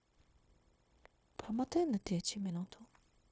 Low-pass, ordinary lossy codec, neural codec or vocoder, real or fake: none; none; codec, 16 kHz, 0.9 kbps, LongCat-Audio-Codec; fake